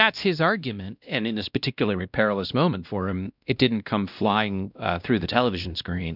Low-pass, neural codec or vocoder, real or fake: 5.4 kHz; codec, 16 kHz, 1 kbps, X-Codec, WavLM features, trained on Multilingual LibriSpeech; fake